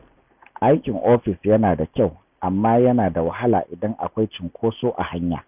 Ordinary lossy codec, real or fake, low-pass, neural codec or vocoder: none; real; 3.6 kHz; none